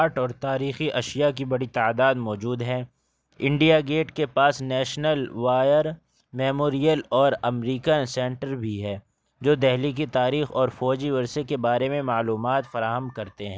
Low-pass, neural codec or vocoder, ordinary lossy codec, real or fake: none; none; none; real